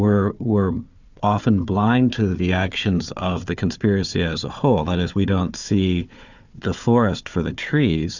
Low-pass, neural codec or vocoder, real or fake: 7.2 kHz; codec, 16 kHz, 4 kbps, FunCodec, trained on Chinese and English, 50 frames a second; fake